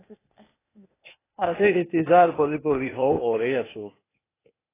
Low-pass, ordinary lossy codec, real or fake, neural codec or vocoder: 3.6 kHz; AAC, 16 kbps; fake; codec, 16 kHz, 0.8 kbps, ZipCodec